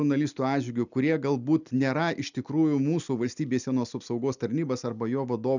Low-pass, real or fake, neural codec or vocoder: 7.2 kHz; real; none